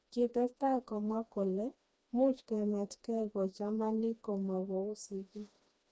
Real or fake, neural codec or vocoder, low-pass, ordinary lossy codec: fake; codec, 16 kHz, 2 kbps, FreqCodec, smaller model; none; none